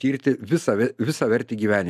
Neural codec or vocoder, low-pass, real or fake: none; 14.4 kHz; real